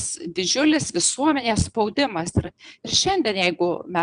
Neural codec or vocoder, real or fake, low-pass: vocoder, 22.05 kHz, 80 mel bands, Vocos; fake; 9.9 kHz